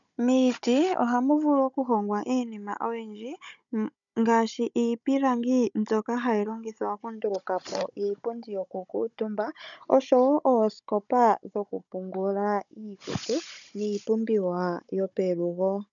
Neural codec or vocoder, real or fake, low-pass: codec, 16 kHz, 16 kbps, FunCodec, trained on Chinese and English, 50 frames a second; fake; 7.2 kHz